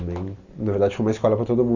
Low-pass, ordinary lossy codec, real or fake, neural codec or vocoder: 7.2 kHz; Opus, 64 kbps; real; none